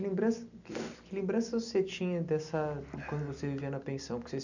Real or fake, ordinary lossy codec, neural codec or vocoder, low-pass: real; none; none; 7.2 kHz